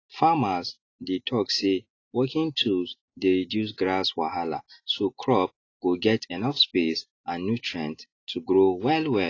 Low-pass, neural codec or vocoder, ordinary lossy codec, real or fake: 7.2 kHz; none; AAC, 32 kbps; real